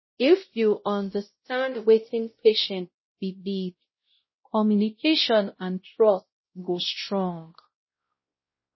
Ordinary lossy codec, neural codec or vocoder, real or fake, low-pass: MP3, 24 kbps; codec, 16 kHz, 0.5 kbps, X-Codec, WavLM features, trained on Multilingual LibriSpeech; fake; 7.2 kHz